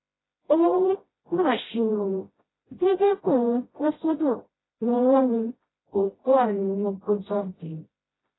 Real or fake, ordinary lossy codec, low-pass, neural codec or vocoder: fake; AAC, 16 kbps; 7.2 kHz; codec, 16 kHz, 0.5 kbps, FreqCodec, smaller model